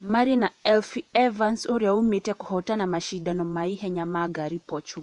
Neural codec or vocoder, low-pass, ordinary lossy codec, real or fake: vocoder, 48 kHz, 128 mel bands, Vocos; 10.8 kHz; none; fake